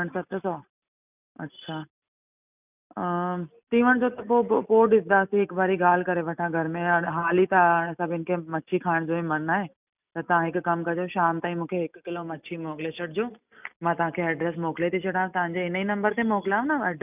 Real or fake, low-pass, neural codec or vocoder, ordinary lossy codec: real; 3.6 kHz; none; none